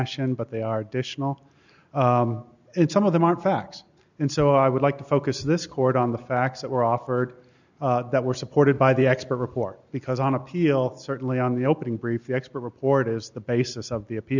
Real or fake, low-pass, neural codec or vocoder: real; 7.2 kHz; none